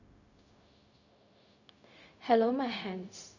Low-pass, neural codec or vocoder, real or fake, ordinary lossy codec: 7.2 kHz; codec, 16 kHz, 0.4 kbps, LongCat-Audio-Codec; fake; none